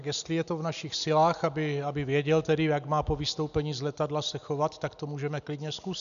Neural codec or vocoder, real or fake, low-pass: none; real; 7.2 kHz